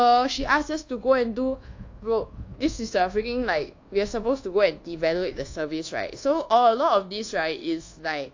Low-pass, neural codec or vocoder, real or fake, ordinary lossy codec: 7.2 kHz; codec, 24 kHz, 1.2 kbps, DualCodec; fake; AAC, 48 kbps